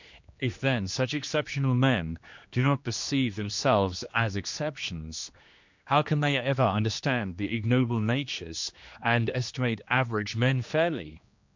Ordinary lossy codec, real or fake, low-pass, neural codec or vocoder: MP3, 64 kbps; fake; 7.2 kHz; codec, 16 kHz, 2 kbps, X-Codec, HuBERT features, trained on general audio